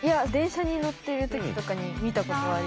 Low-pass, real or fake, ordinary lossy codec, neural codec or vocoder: none; real; none; none